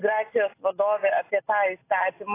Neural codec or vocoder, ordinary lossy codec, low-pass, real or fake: none; AAC, 24 kbps; 3.6 kHz; real